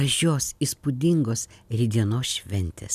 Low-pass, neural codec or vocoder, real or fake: 14.4 kHz; none; real